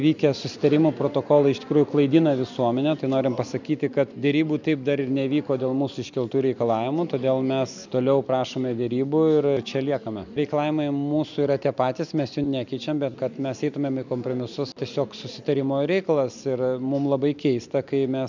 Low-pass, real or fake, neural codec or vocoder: 7.2 kHz; real; none